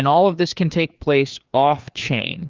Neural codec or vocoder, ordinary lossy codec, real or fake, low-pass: codec, 16 kHz, 2 kbps, FreqCodec, larger model; Opus, 24 kbps; fake; 7.2 kHz